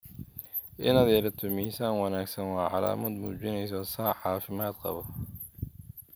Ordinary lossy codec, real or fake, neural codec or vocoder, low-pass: none; real; none; none